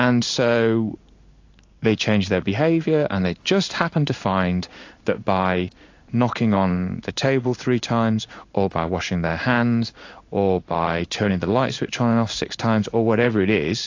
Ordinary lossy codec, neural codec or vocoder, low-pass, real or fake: AAC, 48 kbps; codec, 16 kHz in and 24 kHz out, 1 kbps, XY-Tokenizer; 7.2 kHz; fake